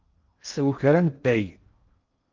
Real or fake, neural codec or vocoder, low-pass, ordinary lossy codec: fake; codec, 16 kHz in and 24 kHz out, 0.6 kbps, FocalCodec, streaming, 2048 codes; 7.2 kHz; Opus, 24 kbps